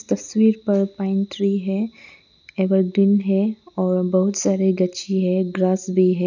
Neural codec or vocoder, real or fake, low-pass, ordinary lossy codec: none; real; 7.2 kHz; none